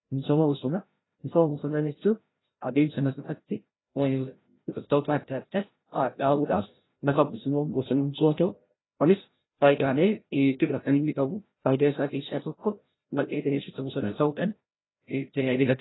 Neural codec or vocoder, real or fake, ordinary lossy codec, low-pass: codec, 16 kHz, 0.5 kbps, FreqCodec, larger model; fake; AAC, 16 kbps; 7.2 kHz